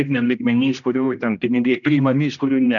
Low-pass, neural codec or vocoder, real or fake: 7.2 kHz; codec, 16 kHz, 1 kbps, X-Codec, HuBERT features, trained on general audio; fake